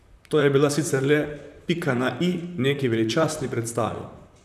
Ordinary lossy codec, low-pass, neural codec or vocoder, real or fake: none; 14.4 kHz; vocoder, 44.1 kHz, 128 mel bands, Pupu-Vocoder; fake